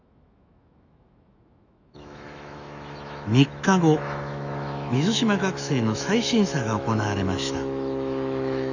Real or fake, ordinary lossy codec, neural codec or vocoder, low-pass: fake; none; autoencoder, 48 kHz, 128 numbers a frame, DAC-VAE, trained on Japanese speech; 7.2 kHz